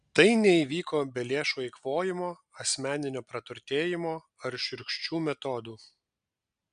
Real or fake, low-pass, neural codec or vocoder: real; 9.9 kHz; none